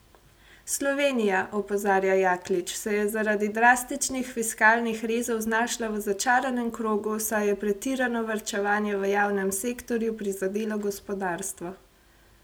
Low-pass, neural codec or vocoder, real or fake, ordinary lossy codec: none; none; real; none